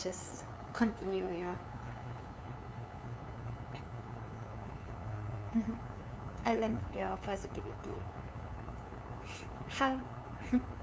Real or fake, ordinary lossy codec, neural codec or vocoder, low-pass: fake; none; codec, 16 kHz, 2 kbps, FunCodec, trained on LibriTTS, 25 frames a second; none